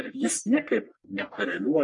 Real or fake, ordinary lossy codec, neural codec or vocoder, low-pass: fake; MP3, 48 kbps; codec, 44.1 kHz, 1.7 kbps, Pupu-Codec; 10.8 kHz